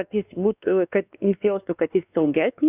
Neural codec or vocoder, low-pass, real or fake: codec, 16 kHz, 2 kbps, X-Codec, WavLM features, trained on Multilingual LibriSpeech; 3.6 kHz; fake